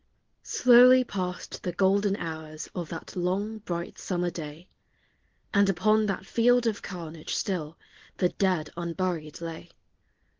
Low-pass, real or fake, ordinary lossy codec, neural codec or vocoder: 7.2 kHz; real; Opus, 16 kbps; none